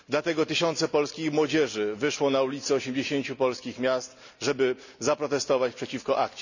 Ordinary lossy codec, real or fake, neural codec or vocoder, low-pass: none; real; none; 7.2 kHz